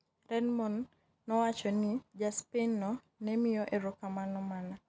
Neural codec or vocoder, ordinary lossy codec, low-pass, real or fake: none; none; none; real